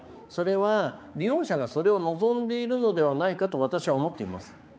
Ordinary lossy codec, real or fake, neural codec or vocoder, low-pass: none; fake; codec, 16 kHz, 4 kbps, X-Codec, HuBERT features, trained on balanced general audio; none